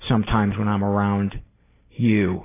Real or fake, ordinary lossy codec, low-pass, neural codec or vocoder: real; AAC, 16 kbps; 3.6 kHz; none